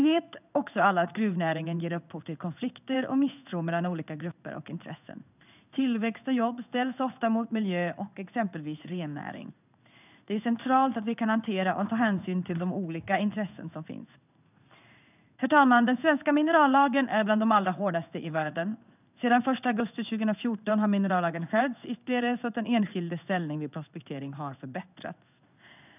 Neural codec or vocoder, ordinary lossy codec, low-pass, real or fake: codec, 16 kHz in and 24 kHz out, 1 kbps, XY-Tokenizer; none; 3.6 kHz; fake